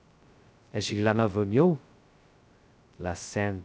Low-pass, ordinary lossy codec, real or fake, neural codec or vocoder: none; none; fake; codec, 16 kHz, 0.2 kbps, FocalCodec